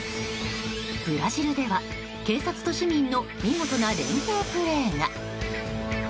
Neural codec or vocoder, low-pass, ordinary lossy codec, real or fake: none; none; none; real